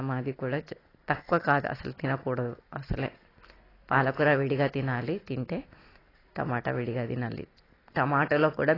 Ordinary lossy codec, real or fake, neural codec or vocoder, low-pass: AAC, 24 kbps; real; none; 5.4 kHz